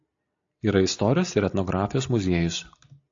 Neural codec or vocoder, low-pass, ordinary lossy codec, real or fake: none; 7.2 kHz; AAC, 64 kbps; real